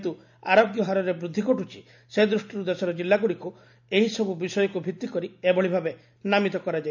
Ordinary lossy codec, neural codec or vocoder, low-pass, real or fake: none; none; 7.2 kHz; real